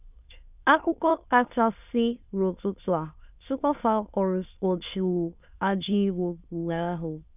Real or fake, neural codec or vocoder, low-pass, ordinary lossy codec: fake; autoencoder, 22.05 kHz, a latent of 192 numbers a frame, VITS, trained on many speakers; 3.6 kHz; none